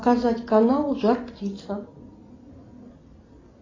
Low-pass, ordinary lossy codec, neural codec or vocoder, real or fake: 7.2 kHz; AAC, 32 kbps; none; real